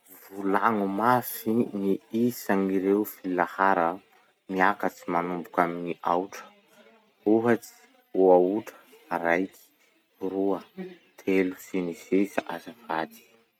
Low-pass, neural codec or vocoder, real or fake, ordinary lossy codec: 19.8 kHz; none; real; none